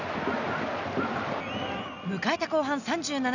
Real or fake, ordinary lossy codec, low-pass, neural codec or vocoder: real; none; 7.2 kHz; none